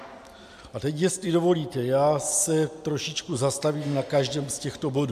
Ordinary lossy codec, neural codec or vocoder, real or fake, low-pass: AAC, 96 kbps; none; real; 14.4 kHz